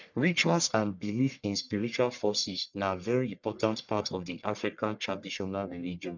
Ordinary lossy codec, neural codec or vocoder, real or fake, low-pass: none; codec, 44.1 kHz, 1.7 kbps, Pupu-Codec; fake; 7.2 kHz